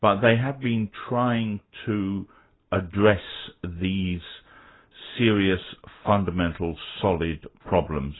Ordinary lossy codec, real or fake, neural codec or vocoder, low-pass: AAC, 16 kbps; real; none; 7.2 kHz